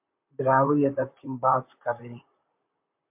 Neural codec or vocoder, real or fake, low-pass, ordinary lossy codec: vocoder, 44.1 kHz, 128 mel bands, Pupu-Vocoder; fake; 3.6 kHz; MP3, 32 kbps